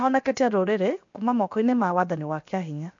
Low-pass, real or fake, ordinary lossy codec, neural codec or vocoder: 7.2 kHz; fake; MP3, 48 kbps; codec, 16 kHz, 0.7 kbps, FocalCodec